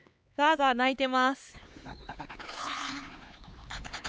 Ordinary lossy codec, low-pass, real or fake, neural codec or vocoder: none; none; fake; codec, 16 kHz, 4 kbps, X-Codec, HuBERT features, trained on LibriSpeech